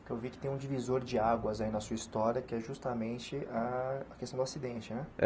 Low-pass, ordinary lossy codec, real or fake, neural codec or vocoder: none; none; real; none